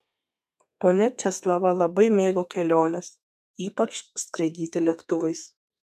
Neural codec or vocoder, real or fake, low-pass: codec, 32 kHz, 1.9 kbps, SNAC; fake; 14.4 kHz